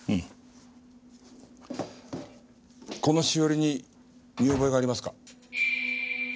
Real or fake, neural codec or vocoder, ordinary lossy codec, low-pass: real; none; none; none